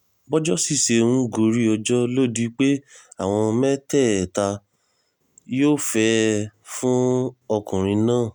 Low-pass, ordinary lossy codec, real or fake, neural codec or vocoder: 19.8 kHz; none; real; none